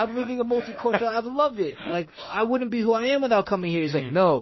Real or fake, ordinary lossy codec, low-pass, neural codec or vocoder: fake; MP3, 24 kbps; 7.2 kHz; autoencoder, 48 kHz, 32 numbers a frame, DAC-VAE, trained on Japanese speech